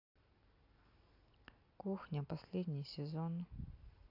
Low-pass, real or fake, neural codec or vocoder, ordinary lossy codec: 5.4 kHz; real; none; AAC, 32 kbps